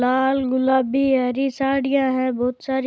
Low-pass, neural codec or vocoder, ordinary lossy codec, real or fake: none; none; none; real